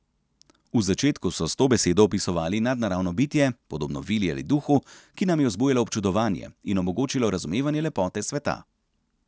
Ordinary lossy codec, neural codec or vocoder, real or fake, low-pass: none; none; real; none